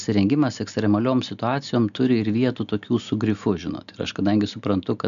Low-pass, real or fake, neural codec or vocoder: 7.2 kHz; real; none